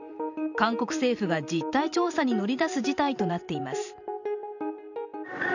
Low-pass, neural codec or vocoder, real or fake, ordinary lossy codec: 7.2 kHz; vocoder, 44.1 kHz, 128 mel bands every 512 samples, BigVGAN v2; fake; none